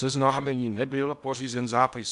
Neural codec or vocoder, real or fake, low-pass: codec, 16 kHz in and 24 kHz out, 0.8 kbps, FocalCodec, streaming, 65536 codes; fake; 10.8 kHz